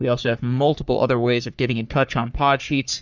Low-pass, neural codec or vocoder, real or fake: 7.2 kHz; codec, 44.1 kHz, 3.4 kbps, Pupu-Codec; fake